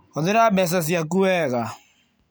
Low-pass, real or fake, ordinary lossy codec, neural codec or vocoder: none; real; none; none